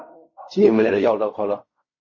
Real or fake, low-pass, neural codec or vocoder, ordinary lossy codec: fake; 7.2 kHz; codec, 16 kHz in and 24 kHz out, 0.4 kbps, LongCat-Audio-Codec, fine tuned four codebook decoder; MP3, 32 kbps